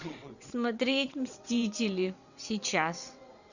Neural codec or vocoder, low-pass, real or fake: vocoder, 22.05 kHz, 80 mel bands, WaveNeXt; 7.2 kHz; fake